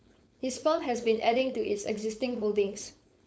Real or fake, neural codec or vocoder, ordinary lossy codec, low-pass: fake; codec, 16 kHz, 4.8 kbps, FACodec; none; none